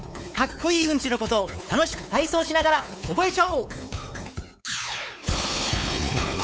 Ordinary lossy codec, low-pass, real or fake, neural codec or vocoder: none; none; fake; codec, 16 kHz, 4 kbps, X-Codec, WavLM features, trained on Multilingual LibriSpeech